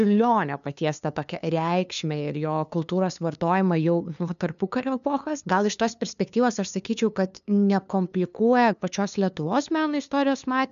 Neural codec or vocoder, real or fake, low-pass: codec, 16 kHz, 2 kbps, FunCodec, trained on LibriTTS, 25 frames a second; fake; 7.2 kHz